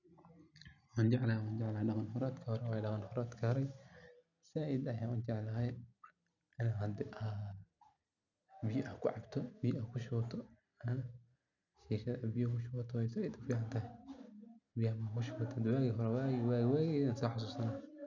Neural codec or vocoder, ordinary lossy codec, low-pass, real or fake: none; none; 7.2 kHz; real